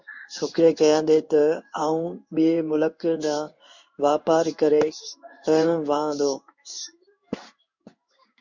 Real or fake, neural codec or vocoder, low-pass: fake; codec, 16 kHz in and 24 kHz out, 1 kbps, XY-Tokenizer; 7.2 kHz